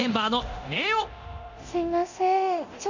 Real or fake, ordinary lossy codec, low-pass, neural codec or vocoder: fake; none; 7.2 kHz; codec, 24 kHz, 0.9 kbps, DualCodec